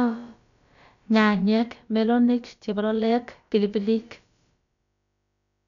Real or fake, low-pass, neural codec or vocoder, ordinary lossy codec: fake; 7.2 kHz; codec, 16 kHz, about 1 kbps, DyCAST, with the encoder's durations; none